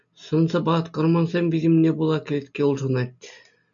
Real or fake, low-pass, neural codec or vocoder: real; 7.2 kHz; none